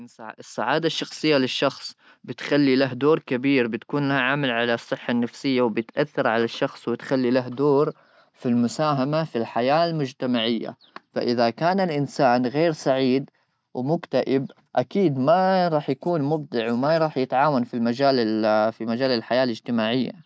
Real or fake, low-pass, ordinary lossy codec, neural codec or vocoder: real; none; none; none